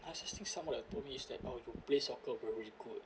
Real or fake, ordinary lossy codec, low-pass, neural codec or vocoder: real; none; none; none